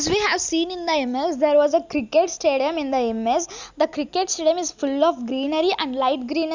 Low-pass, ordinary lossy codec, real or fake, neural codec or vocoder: 7.2 kHz; Opus, 64 kbps; real; none